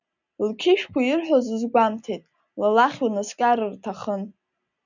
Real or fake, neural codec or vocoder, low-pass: real; none; 7.2 kHz